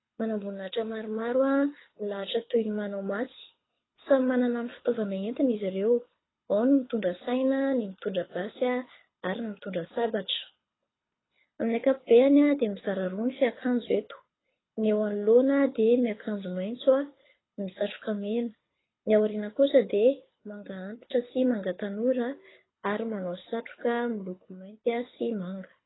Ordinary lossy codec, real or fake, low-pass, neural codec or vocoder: AAC, 16 kbps; fake; 7.2 kHz; codec, 24 kHz, 6 kbps, HILCodec